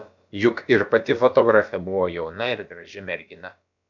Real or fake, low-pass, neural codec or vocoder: fake; 7.2 kHz; codec, 16 kHz, about 1 kbps, DyCAST, with the encoder's durations